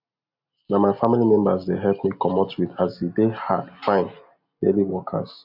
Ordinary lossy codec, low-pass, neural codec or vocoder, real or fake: none; 5.4 kHz; none; real